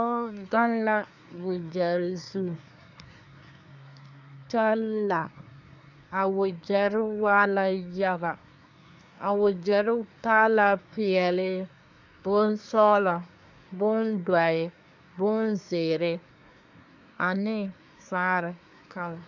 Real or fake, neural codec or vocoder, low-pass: fake; codec, 24 kHz, 1 kbps, SNAC; 7.2 kHz